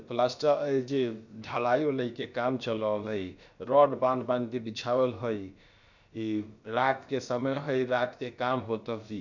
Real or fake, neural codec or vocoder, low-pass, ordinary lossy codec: fake; codec, 16 kHz, about 1 kbps, DyCAST, with the encoder's durations; 7.2 kHz; none